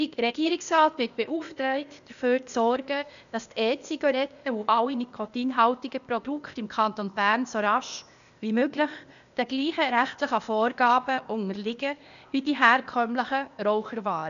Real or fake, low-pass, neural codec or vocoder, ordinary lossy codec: fake; 7.2 kHz; codec, 16 kHz, 0.8 kbps, ZipCodec; none